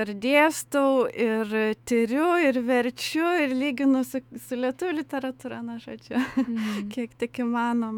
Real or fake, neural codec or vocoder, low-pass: fake; autoencoder, 48 kHz, 128 numbers a frame, DAC-VAE, trained on Japanese speech; 19.8 kHz